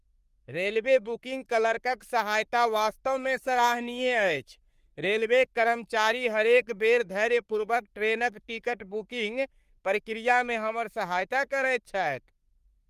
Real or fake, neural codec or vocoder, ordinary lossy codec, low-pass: fake; codec, 44.1 kHz, 3.4 kbps, Pupu-Codec; Opus, 32 kbps; 14.4 kHz